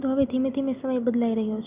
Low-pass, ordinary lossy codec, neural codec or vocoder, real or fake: 3.6 kHz; Opus, 64 kbps; none; real